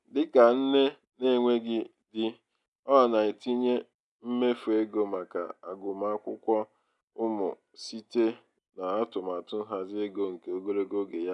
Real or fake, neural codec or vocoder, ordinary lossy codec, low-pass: real; none; none; 10.8 kHz